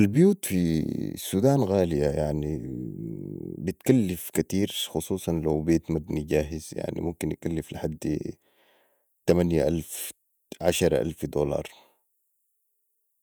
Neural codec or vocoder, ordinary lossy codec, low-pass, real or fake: vocoder, 48 kHz, 128 mel bands, Vocos; none; none; fake